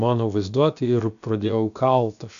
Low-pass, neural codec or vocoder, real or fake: 7.2 kHz; codec, 16 kHz, about 1 kbps, DyCAST, with the encoder's durations; fake